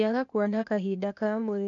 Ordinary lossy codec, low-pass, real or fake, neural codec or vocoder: none; 7.2 kHz; fake; codec, 16 kHz, 0.8 kbps, ZipCodec